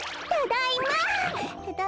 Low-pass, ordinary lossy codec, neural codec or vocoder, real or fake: none; none; none; real